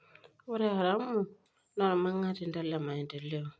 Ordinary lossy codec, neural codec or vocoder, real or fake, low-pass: none; none; real; none